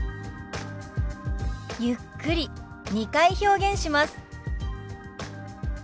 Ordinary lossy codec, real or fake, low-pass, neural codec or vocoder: none; real; none; none